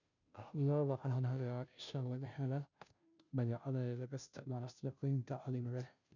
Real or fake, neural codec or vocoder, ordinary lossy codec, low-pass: fake; codec, 16 kHz, 0.5 kbps, FunCodec, trained on Chinese and English, 25 frames a second; none; 7.2 kHz